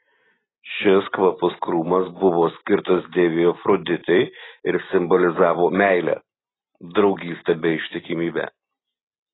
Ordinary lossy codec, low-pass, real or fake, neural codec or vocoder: AAC, 16 kbps; 7.2 kHz; real; none